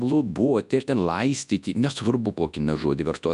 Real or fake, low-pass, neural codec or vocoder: fake; 10.8 kHz; codec, 24 kHz, 0.9 kbps, WavTokenizer, large speech release